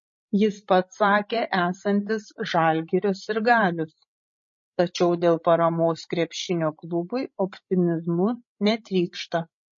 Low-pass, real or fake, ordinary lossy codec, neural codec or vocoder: 7.2 kHz; fake; MP3, 32 kbps; codec, 16 kHz, 16 kbps, FreqCodec, larger model